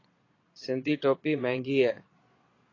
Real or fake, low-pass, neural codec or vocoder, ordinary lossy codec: fake; 7.2 kHz; vocoder, 22.05 kHz, 80 mel bands, Vocos; AAC, 32 kbps